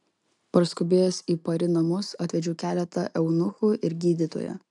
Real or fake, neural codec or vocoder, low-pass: real; none; 10.8 kHz